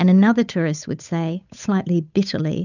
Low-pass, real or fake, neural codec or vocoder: 7.2 kHz; fake; codec, 16 kHz, 8 kbps, FunCodec, trained on Chinese and English, 25 frames a second